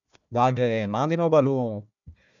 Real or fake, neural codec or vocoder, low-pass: fake; codec, 16 kHz, 1 kbps, FunCodec, trained on Chinese and English, 50 frames a second; 7.2 kHz